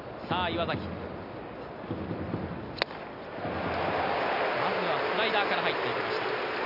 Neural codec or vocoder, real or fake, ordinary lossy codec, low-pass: none; real; none; 5.4 kHz